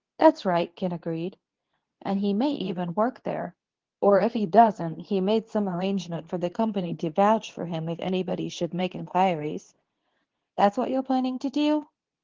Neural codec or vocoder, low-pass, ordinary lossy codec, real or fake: codec, 24 kHz, 0.9 kbps, WavTokenizer, medium speech release version 2; 7.2 kHz; Opus, 16 kbps; fake